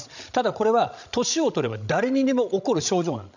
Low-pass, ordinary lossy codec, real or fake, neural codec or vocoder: 7.2 kHz; none; fake; codec, 16 kHz, 16 kbps, FreqCodec, larger model